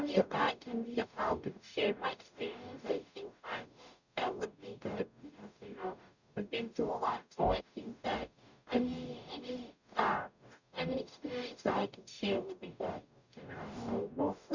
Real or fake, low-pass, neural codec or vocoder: fake; 7.2 kHz; codec, 44.1 kHz, 0.9 kbps, DAC